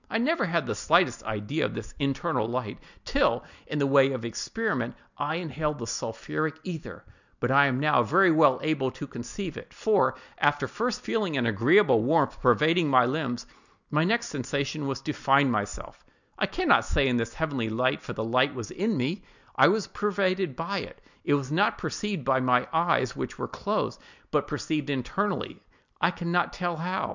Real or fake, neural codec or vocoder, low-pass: real; none; 7.2 kHz